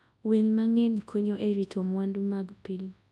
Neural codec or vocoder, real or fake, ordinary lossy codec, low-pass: codec, 24 kHz, 0.9 kbps, WavTokenizer, large speech release; fake; none; none